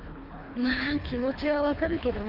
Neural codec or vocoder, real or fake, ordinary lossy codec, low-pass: codec, 24 kHz, 3 kbps, HILCodec; fake; Opus, 32 kbps; 5.4 kHz